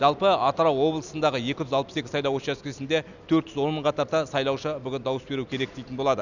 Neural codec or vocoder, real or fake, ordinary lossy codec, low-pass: none; real; none; 7.2 kHz